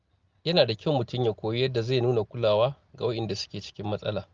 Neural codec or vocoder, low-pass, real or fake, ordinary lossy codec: none; 7.2 kHz; real; Opus, 32 kbps